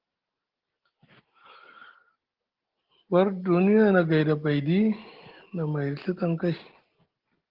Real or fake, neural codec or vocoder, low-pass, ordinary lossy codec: real; none; 5.4 kHz; Opus, 16 kbps